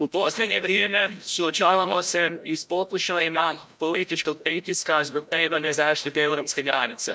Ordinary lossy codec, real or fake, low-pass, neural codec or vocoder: none; fake; none; codec, 16 kHz, 0.5 kbps, FreqCodec, larger model